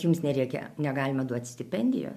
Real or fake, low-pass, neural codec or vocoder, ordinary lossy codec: real; 14.4 kHz; none; MP3, 96 kbps